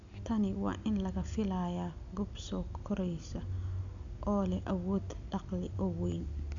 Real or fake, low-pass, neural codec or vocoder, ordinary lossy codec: real; 7.2 kHz; none; none